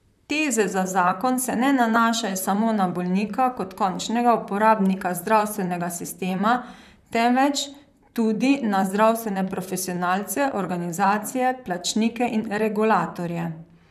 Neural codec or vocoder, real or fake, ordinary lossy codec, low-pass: vocoder, 44.1 kHz, 128 mel bands, Pupu-Vocoder; fake; none; 14.4 kHz